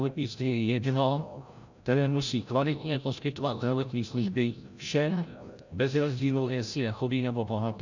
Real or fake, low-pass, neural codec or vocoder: fake; 7.2 kHz; codec, 16 kHz, 0.5 kbps, FreqCodec, larger model